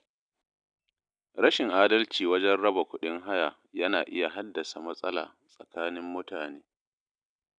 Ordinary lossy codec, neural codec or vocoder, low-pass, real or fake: none; none; 9.9 kHz; real